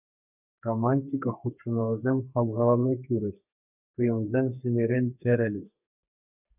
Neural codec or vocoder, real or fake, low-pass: codec, 32 kHz, 1.9 kbps, SNAC; fake; 3.6 kHz